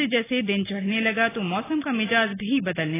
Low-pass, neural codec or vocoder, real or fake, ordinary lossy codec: 3.6 kHz; none; real; AAC, 16 kbps